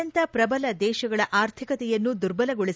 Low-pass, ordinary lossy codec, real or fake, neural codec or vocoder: none; none; real; none